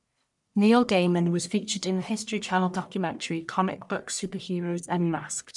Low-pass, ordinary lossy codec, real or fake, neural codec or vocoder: 10.8 kHz; none; fake; codec, 44.1 kHz, 1.7 kbps, Pupu-Codec